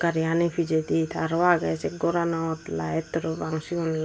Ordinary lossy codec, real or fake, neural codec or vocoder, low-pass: none; real; none; none